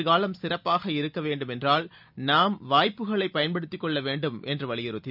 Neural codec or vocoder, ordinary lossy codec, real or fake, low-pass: none; none; real; 5.4 kHz